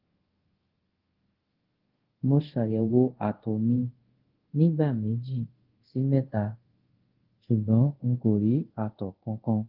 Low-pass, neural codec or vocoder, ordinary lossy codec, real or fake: 5.4 kHz; codec, 24 kHz, 0.5 kbps, DualCodec; Opus, 16 kbps; fake